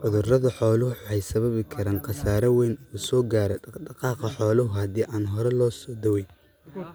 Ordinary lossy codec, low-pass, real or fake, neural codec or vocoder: none; none; real; none